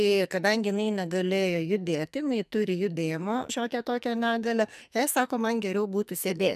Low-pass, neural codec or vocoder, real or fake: 14.4 kHz; codec, 44.1 kHz, 2.6 kbps, SNAC; fake